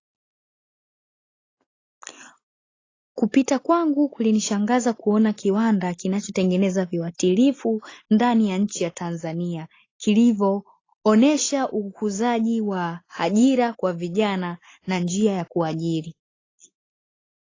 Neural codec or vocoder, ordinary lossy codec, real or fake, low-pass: none; AAC, 32 kbps; real; 7.2 kHz